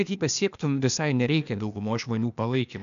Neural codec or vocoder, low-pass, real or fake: codec, 16 kHz, 0.8 kbps, ZipCodec; 7.2 kHz; fake